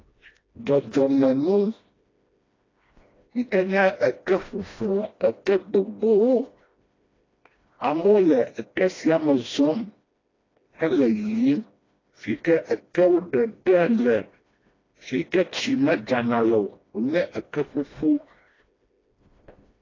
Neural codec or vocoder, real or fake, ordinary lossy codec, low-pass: codec, 16 kHz, 1 kbps, FreqCodec, smaller model; fake; AAC, 32 kbps; 7.2 kHz